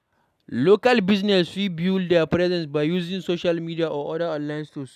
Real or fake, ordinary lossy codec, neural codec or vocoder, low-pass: real; none; none; 14.4 kHz